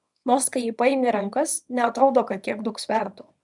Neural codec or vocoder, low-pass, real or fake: codec, 24 kHz, 0.9 kbps, WavTokenizer, small release; 10.8 kHz; fake